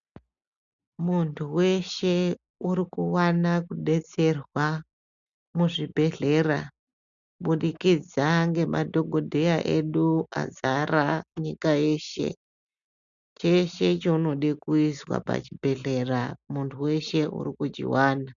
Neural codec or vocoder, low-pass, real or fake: none; 7.2 kHz; real